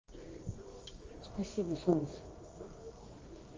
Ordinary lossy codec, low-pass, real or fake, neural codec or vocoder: Opus, 32 kbps; 7.2 kHz; fake; codec, 24 kHz, 0.9 kbps, WavTokenizer, medium music audio release